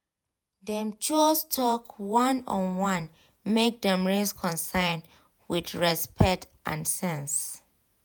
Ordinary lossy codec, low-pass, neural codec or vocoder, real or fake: none; none; vocoder, 48 kHz, 128 mel bands, Vocos; fake